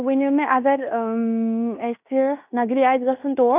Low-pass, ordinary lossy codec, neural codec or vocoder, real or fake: 3.6 kHz; none; codec, 16 kHz in and 24 kHz out, 0.9 kbps, LongCat-Audio-Codec, fine tuned four codebook decoder; fake